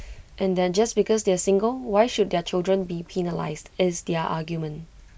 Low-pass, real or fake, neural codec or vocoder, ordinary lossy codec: none; real; none; none